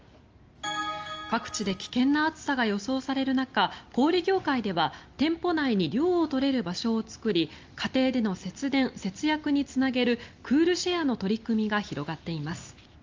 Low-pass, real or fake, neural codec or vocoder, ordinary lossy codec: 7.2 kHz; real; none; Opus, 24 kbps